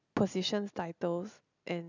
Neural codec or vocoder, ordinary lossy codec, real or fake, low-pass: none; none; real; 7.2 kHz